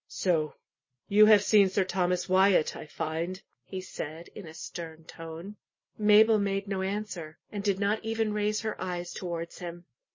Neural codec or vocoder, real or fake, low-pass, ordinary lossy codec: none; real; 7.2 kHz; MP3, 32 kbps